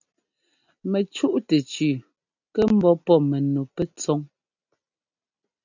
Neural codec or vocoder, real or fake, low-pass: none; real; 7.2 kHz